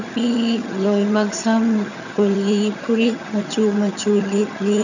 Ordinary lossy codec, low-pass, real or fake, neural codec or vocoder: none; 7.2 kHz; fake; vocoder, 22.05 kHz, 80 mel bands, HiFi-GAN